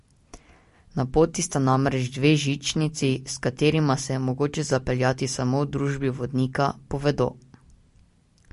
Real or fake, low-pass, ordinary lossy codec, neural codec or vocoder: real; 14.4 kHz; MP3, 48 kbps; none